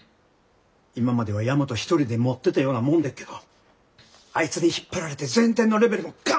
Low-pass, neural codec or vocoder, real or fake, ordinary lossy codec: none; none; real; none